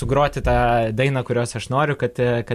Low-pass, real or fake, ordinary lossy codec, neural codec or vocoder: 14.4 kHz; real; MP3, 64 kbps; none